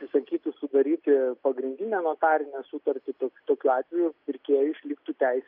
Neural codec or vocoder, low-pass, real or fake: none; 5.4 kHz; real